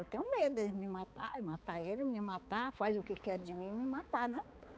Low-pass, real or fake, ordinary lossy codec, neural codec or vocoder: none; fake; none; codec, 16 kHz, 4 kbps, X-Codec, HuBERT features, trained on balanced general audio